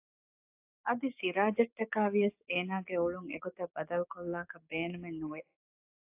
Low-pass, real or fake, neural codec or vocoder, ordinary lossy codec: 3.6 kHz; fake; codec, 16 kHz, 6 kbps, DAC; AAC, 32 kbps